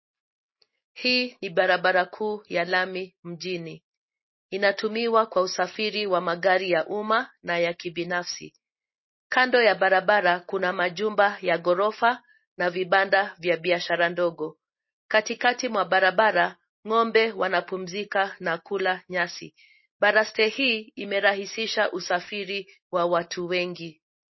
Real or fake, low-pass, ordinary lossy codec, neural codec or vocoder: real; 7.2 kHz; MP3, 24 kbps; none